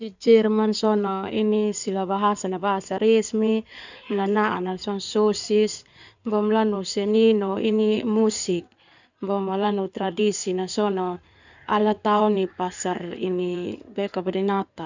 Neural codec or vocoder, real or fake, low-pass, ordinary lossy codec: codec, 16 kHz in and 24 kHz out, 2.2 kbps, FireRedTTS-2 codec; fake; 7.2 kHz; none